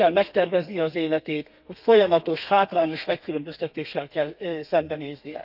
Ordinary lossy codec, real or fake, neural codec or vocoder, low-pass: none; fake; codec, 32 kHz, 1.9 kbps, SNAC; 5.4 kHz